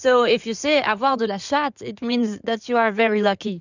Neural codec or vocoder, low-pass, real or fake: codec, 16 kHz in and 24 kHz out, 2.2 kbps, FireRedTTS-2 codec; 7.2 kHz; fake